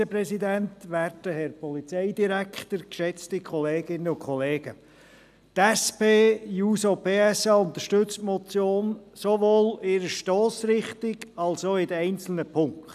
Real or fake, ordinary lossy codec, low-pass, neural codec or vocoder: real; none; 14.4 kHz; none